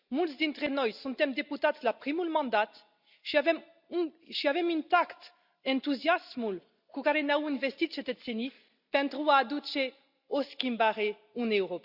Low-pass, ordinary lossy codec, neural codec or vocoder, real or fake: 5.4 kHz; Opus, 64 kbps; none; real